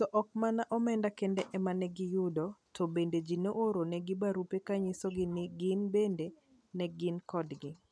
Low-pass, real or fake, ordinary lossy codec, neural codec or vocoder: none; real; none; none